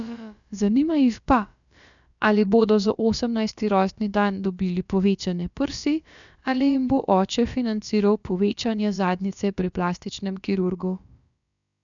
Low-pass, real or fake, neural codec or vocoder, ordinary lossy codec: 7.2 kHz; fake; codec, 16 kHz, about 1 kbps, DyCAST, with the encoder's durations; none